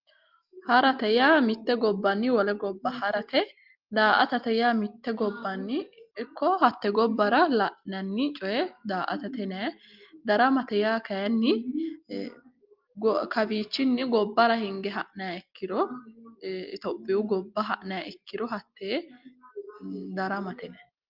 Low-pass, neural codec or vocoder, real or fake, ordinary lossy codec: 5.4 kHz; none; real; Opus, 32 kbps